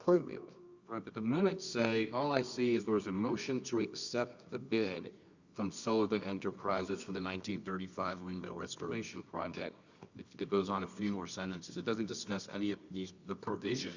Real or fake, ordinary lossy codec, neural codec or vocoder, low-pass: fake; Opus, 64 kbps; codec, 24 kHz, 0.9 kbps, WavTokenizer, medium music audio release; 7.2 kHz